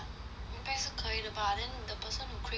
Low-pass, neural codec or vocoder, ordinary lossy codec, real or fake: none; none; none; real